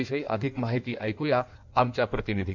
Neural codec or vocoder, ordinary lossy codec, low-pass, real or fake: codec, 16 kHz in and 24 kHz out, 1.1 kbps, FireRedTTS-2 codec; none; 7.2 kHz; fake